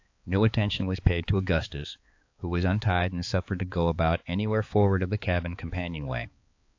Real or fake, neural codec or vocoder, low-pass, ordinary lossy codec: fake; codec, 16 kHz, 4 kbps, X-Codec, HuBERT features, trained on balanced general audio; 7.2 kHz; AAC, 48 kbps